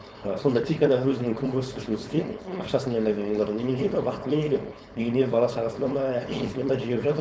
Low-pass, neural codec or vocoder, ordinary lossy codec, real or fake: none; codec, 16 kHz, 4.8 kbps, FACodec; none; fake